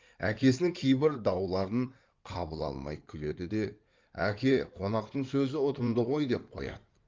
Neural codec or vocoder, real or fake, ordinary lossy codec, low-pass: codec, 16 kHz in and 24 kHz out, 2.2 kbps, FireRedTTS-2 codec; fake; Opus, 24 kbps; 7.2 kHz